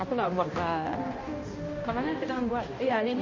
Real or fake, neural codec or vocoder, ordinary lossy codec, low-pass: fake; codec, 16 kHz, 1 kbps, X-Codec, HuBERT features, trained on balanced general audio; MP3, 32 kbps; 7.2 kHz